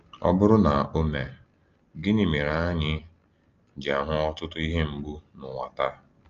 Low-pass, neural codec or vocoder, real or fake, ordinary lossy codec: 7.2 kHz; none; real; Opus, 24 kbps